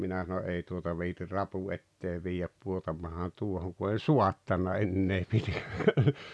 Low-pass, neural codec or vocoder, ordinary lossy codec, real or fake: none; none; none; real